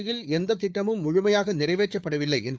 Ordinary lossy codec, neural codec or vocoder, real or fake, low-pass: none; codec, 16 kHz, 4 kbps, FunCodec, trained on Chinese and English, 50 frames a second; fake; none